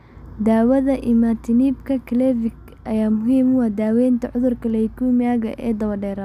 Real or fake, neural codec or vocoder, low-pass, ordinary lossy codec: real; none; 14.4 kHz; none